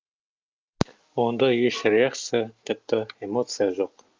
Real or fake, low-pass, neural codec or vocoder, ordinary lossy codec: real; 7.2 kHz; none; Opus, 24 kbps